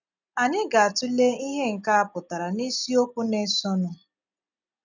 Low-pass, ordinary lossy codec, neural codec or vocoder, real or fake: 7.2 kHz; none; none; real